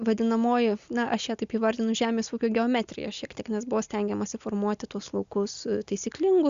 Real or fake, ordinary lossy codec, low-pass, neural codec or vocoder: real; Opus, 64 kbps; 7.2 kHz; none